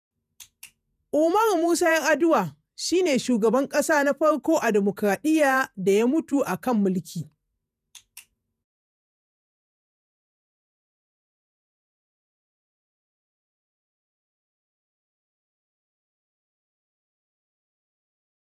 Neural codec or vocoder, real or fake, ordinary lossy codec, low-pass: vocoder, 48 kHz, 128 mel bands, Vocos; fake; none; 14.4 kHz